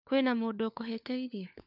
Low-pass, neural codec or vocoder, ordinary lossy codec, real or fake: 5.4 kHz; codec, 16 kHz, 4 kbps, FunCodec, trained on LibriTTS, 50 frames a second; none; fake